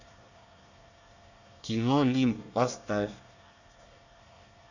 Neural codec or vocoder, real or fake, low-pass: codec, 24 kHz, 1 kbps, SNAC; fake; 7.2 kHz